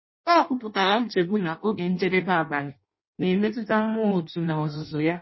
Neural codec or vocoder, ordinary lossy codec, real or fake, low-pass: codec, 16 kHz in and 24 kHz out, 0.6 kbps, FireRedTTS-2 codec; MP3, 24 kbps; fake; 7.2 kHz